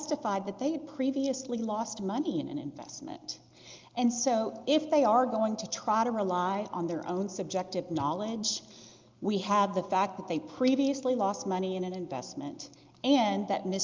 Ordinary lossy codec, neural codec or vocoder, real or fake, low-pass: Opus, 24 kbps; none; real; 7.2 kHz